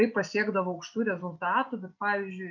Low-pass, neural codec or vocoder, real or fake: 7.2 kHz; none; real